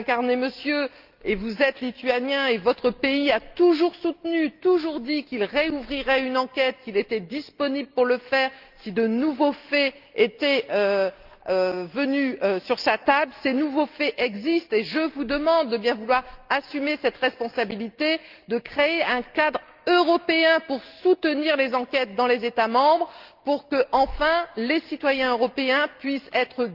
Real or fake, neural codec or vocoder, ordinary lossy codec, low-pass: real; none; Opus, 32 kbps; 5.4 kHz